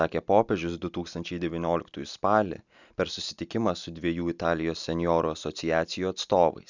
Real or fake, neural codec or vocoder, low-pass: real; none; 7.2 kHz